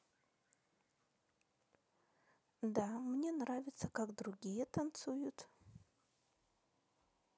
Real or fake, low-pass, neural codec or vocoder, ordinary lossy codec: real; none; none; none